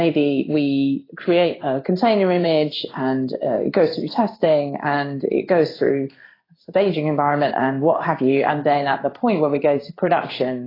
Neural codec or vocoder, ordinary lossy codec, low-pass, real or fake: codec, 16 kHz in and 24 kHz out, 1 kbps, XY-Tokenizer; AAC, 24 kbps; 5.4 kHz; fake